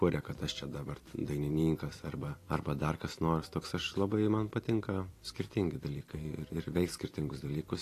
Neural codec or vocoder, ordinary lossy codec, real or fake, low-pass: vocoder, 44.1 kHz, 128 mel bands every 256 samples, BigVGAN v2; AAC, 48 kbps; fake; 14.4 kHz